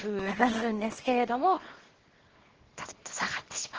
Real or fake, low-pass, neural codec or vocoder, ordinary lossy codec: fake; 7.2 kHz; codec, 24 kHz, 0.9 kbps, WavTokenizer, small release; Opus, 16 kbps